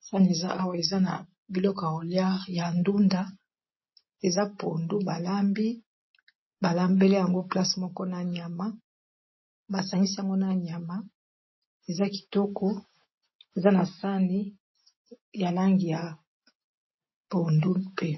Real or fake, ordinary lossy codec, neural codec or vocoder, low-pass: real; MP3, 24 kbps; none; 7.2 kHz